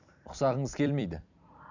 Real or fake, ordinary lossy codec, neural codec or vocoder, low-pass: fake; none; vocoder, 44.1 kHz, 128 mel bands every 256 samples, BigVGAN v2; 7.2 kHz